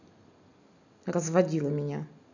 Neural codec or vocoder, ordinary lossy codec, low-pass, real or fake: none; none; 7.2 kHz; real